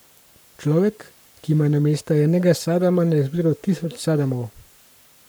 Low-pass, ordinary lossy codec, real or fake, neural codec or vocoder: none; none; fake; codec, 44.1 kHz, 7.8 kbps, Pupu-Codec